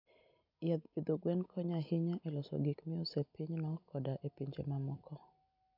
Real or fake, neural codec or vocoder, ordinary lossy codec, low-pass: real; none; none; 5.4 kHz